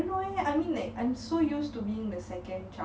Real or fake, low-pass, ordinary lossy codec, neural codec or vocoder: real; none; none; none